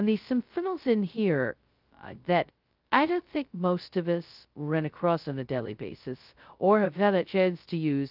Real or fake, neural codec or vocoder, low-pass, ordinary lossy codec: fake; codec, 16 kHz, 0.2 kbps, FocalCodec; 5.4 kHz; Opus, 24 kbps